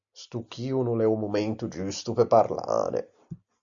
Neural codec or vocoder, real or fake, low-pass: none; real; 7.2 kHz